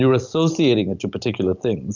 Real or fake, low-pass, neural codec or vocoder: real; 7.2 kHz; none